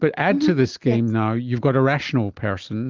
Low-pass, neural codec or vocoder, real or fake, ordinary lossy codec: 7.2 kHz; none; real; Opus, 32 kbps